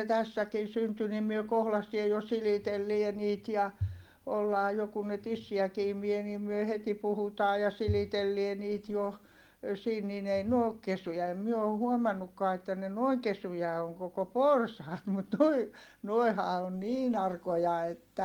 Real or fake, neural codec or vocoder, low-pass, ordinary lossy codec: real; none; 19.8 kHz; Opus, 24 kbps